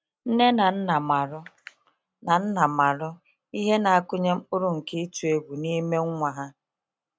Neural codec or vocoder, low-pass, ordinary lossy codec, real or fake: none; none; none; real